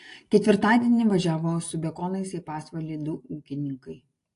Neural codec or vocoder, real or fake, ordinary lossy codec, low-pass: none; real; AAC, 48 kbps; 10.8 kHz